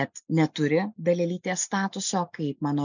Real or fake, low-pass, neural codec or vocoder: real; 7.2 kHz; none